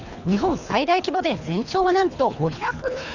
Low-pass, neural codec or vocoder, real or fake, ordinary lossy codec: 7.2 kHz; codec, 24 kHz, 3 kbps, HILCodec; fake; none